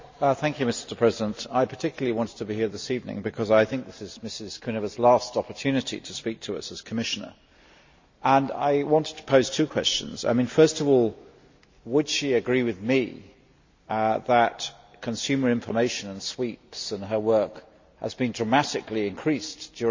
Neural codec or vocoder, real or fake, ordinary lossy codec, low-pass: none; real; MP3, 64 kbps; 7.2 kHz